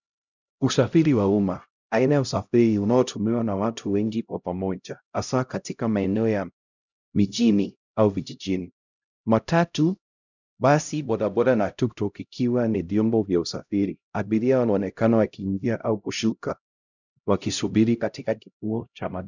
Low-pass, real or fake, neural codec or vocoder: 7.2 kHz; fake; codec, 16 kHz, 0.5 kbps, X-Codec, HuBERT features, trained on LibriSpeech